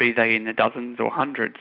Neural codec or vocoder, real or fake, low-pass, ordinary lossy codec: none; real; 5.4 kHz; AAC, 48 kbps